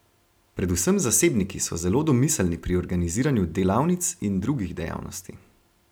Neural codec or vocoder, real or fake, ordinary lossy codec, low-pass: none; real; none; none